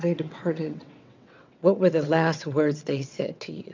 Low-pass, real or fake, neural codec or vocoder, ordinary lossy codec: 7.2 kHz; fake; vocoder, 22.05 kHz, 80 mel bands, WaveNeXt; MP3, 64 kbps